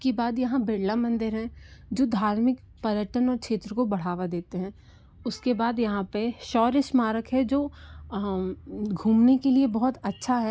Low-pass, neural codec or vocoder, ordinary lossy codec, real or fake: none; none; none; real